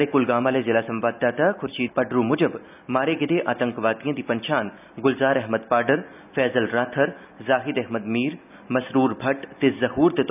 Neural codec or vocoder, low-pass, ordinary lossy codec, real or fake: none; 3.6 kHz; none; real